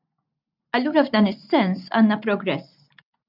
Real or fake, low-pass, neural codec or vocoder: real; 5.4 kHz; none